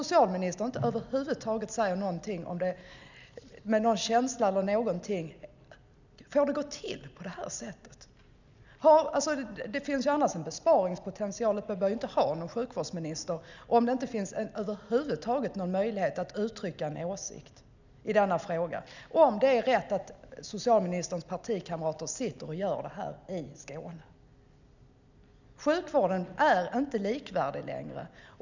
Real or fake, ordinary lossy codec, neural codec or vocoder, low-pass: real; none; none; 7.2 kHz